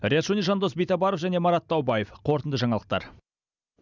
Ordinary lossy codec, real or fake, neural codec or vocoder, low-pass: none; real; none; 7.2 kHz